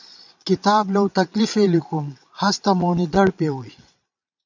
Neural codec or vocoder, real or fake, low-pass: vocoder, 22.05 kHz, 80 mel bands, Vocos; fake; 7.2 kHz